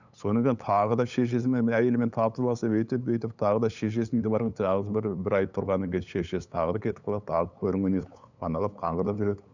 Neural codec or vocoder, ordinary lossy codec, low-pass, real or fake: codec, 16 kHz, 8 kbps, FunCodec, trained on LibriTTS, 25 frames a second; none; 7.2 kHz; fake